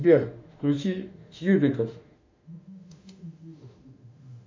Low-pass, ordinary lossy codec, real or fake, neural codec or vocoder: 7.2 kHz; MP3, 64 kbps; fake; autoencoder, 48 kHz, 32 numbers a frame, DAC-VAE, trained on Japanese speech